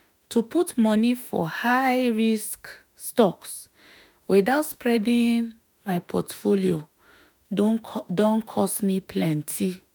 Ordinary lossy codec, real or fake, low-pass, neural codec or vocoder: none; fake; none; autoencoder, 48 kHz, 32 numbers a frame, DAC-VAE, trained on Japanese speech